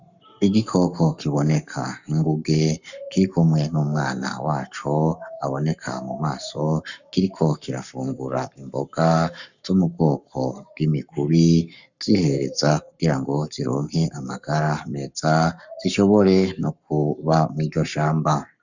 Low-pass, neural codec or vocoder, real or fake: 7.2 kHz; codec, 44.1 kHz, 7.8 kbps, Pupu-Codec; fake